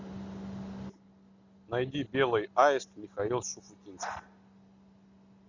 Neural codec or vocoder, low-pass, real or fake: none; 7.2 kHz; real